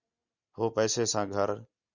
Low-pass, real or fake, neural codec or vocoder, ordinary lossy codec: 7.2 kHz; real; none; Opus, 64 kbps